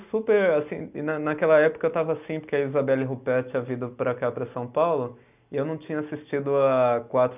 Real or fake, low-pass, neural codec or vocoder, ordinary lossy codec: real; 3.6 kHz; none; none